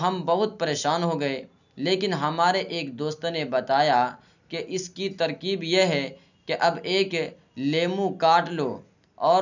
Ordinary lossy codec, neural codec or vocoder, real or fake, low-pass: none; none; real; 7.2 kHz